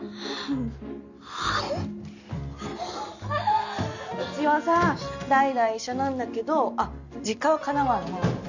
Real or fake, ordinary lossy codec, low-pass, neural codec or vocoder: real; none; 7.2 kHz; none